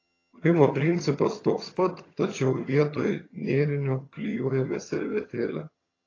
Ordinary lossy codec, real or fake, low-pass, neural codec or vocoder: AAC, 32 kbps; fake; 7.2 kHz; vocoder, 22.05 kHz, 80 mel bands, HiFi-GAN